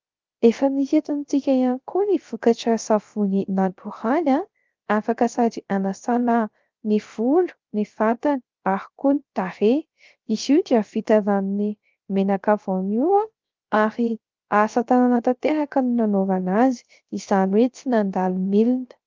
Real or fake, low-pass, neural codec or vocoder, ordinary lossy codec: fake; 7.2 kHz; codec, 16 kHz, 0.3 kbps, FocalCodec; Opus, 32 kbps